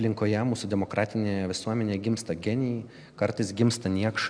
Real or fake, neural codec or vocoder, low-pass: real; none; 9.9 kHz